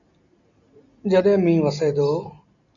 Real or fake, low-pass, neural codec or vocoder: real; 7.2 kHz; none